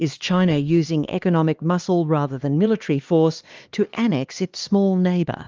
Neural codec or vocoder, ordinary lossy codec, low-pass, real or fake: codec, 16 kHz, 2 kbps, X-Codec, HuBERT features, trained on LibriSpeech; Opus, 24 kbps; 7.2 kHz; fake